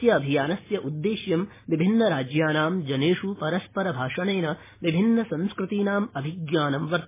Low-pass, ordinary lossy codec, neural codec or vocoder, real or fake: 3.6 kHz; MP3, 16 kbps; none; real